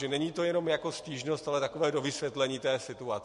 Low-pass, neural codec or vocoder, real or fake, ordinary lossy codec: 14.4 kHz; none; real; MP3, 48 kbps